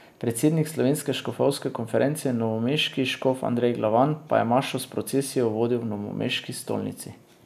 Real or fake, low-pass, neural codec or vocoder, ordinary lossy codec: real; 14.4 kHz; none; none